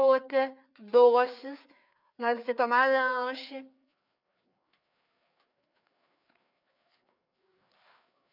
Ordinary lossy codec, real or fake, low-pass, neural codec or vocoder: none; fake; 5.4 kHz; codec, 16 kHz, 4 kbps, FreqCodec, larger model